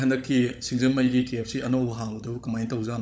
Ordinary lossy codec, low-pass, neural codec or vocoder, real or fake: none; none; codec, 16 kHz, 16 kbps, FunCodec, trained on LibriTTS, 50 frames a second; fake